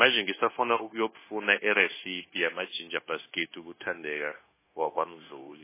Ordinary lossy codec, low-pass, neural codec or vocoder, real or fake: MP3, 16 kbps; 3.6 kHz; codec, 16 kHz, 0.9 kbps, LongCat-Audio-Codec; fake